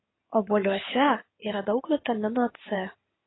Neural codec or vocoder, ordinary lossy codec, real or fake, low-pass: codec, 16 kHz in and 24 kHz out, 2.2 kbps, FireRedTTS-2 codec; AAC, 16 kbps; fake; 7.2 kHz